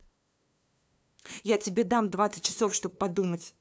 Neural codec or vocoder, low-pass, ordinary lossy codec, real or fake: codec, 16 kHz, 2 kbps, FunCodec, trained on LibriTTS, 25 frames a second; none; none; fake